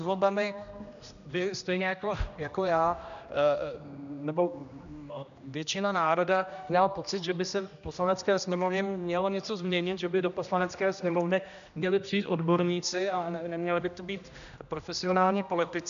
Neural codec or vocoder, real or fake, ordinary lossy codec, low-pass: codec, 16 kHz, 1 kbps, X-Codec, HuBERT features, trained on general audio; fake; MP3, 96 kbps; 7.2 kHz